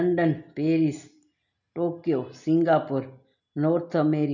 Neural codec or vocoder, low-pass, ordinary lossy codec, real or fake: none; 7.2 kHz; none; real